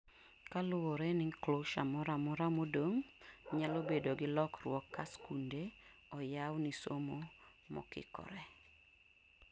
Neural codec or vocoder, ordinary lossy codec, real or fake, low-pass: none; none; real; none